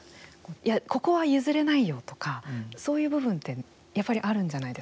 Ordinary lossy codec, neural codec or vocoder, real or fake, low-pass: none; none; real; none